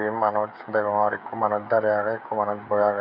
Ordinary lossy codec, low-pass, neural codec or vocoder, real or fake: none; 5.4 kHz; codec, 16 kHz, 16 kbps, FreqCodec, smaller model; fake